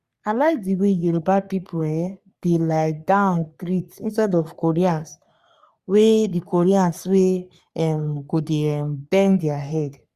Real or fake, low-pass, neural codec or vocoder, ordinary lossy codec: fake; 14.4 kHz; codec, 44.1 kHz, 3.4 kbps, Pupu-Codec; Opus, 64 kbps